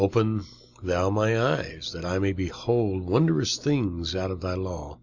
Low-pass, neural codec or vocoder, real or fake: 7.2 kHz; none; real